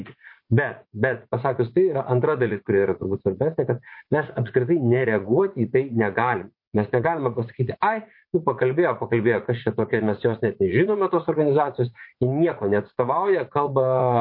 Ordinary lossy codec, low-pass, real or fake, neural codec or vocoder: MP3, 32 kbps; 5.4 kHz; fake; vocoder, 44.1 kHz, 80 mel bands, Vocos